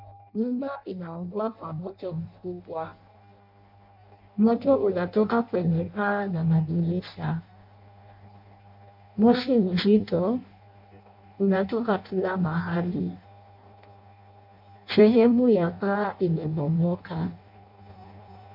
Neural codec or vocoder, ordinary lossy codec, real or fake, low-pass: codec, 16 kHz in and 24 kHz out, 0.6 kbps, FireRedTTS-2 codec; MP3, 48 kbps; fake; 5.4 kHz